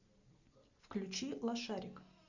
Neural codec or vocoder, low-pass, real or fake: none; 7.2 kHz; real